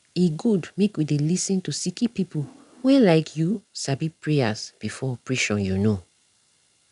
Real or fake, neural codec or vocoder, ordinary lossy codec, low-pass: real; none; none; 10.8 kHz